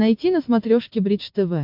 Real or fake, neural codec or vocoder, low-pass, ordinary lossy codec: real; none; 5.4 kHz; MP3, 48 kbps